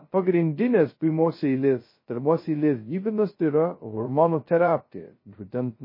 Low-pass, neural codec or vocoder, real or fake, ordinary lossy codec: 5.4 kHz; codec, 16 kHz, 0.2 kbps, FocalCodec; fake; MP3, 24 kbps